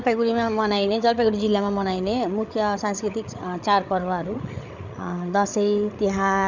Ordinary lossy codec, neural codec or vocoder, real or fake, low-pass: none; codec, 16 kHz, 8 kbps, FreqCodec, larger model; fake; 7.2 kHz